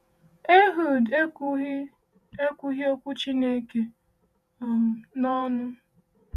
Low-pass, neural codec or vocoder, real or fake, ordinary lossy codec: 14.4 kHz; vocoder, 48 kHz, 128 mel bands, Vocos; fake; none